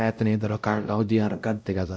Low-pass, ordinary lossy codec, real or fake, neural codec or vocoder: none; none; fake; codec, 16 kHz, 0.5 kbps, X-Codec, WavLM features, trained on Multilingual LibriSpeech